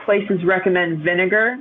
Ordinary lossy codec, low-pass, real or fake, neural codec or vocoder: AAC, 48 kbps; 7.2 kHz; real; none